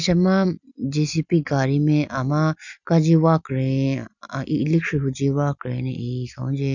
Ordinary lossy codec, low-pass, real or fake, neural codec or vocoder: none; 7.2 kHz; real; none